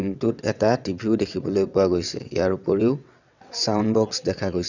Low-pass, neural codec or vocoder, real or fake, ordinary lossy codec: 7.2 kHz; vocoder, 22.05 kHz, 80 mel bands, WaveNeXt; fake; none